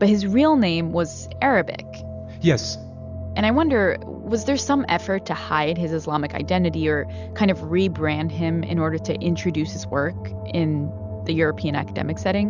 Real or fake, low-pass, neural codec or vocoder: real; 7.2 kHz; none